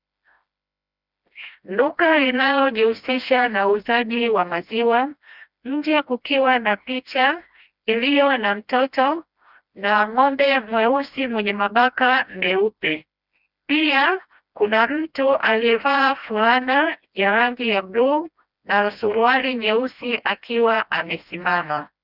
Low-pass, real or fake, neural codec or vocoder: 5.4 kHz; fake; codec, 16 kHz, 1 kbps, FreqCodec, smaller model